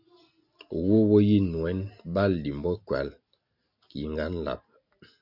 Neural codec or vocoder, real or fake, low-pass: none; real; 5.4 kHz